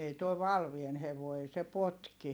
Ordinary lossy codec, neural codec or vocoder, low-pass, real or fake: none; vocoder, 44.1 kHz, 128 mel bands every 256 samples, BigVGAN v2; none; fake